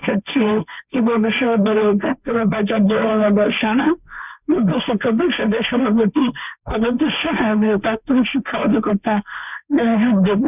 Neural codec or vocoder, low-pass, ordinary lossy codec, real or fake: codec, 16 kHz, 1.1 kbps, Voila-Tokenizer; 3.6 kHz; none; fake